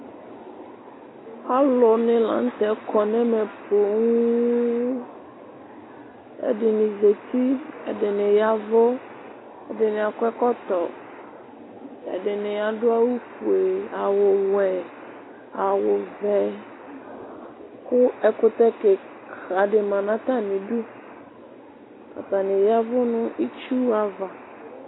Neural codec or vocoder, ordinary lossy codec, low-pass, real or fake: none; AAC, 16 kbps; 7.2 kHz; real